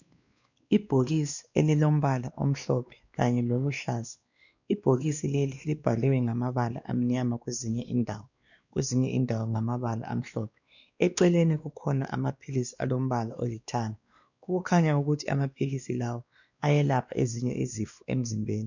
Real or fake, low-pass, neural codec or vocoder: fake; 7.2 kHz; codec, 16 kHz, 2 kbps, X-Codec, WavLM features, trained on Multilingual LibriSpeech